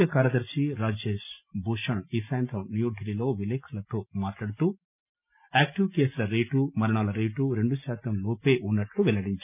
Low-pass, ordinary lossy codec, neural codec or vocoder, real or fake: 3.6 kHz; none; none; real